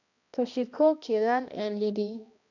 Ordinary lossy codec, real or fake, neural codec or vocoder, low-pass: none; fake; codec, 16 kHz, 1 kbps, X-Codec, HuBERT features, trained on balanced general audio; 7.2 kHz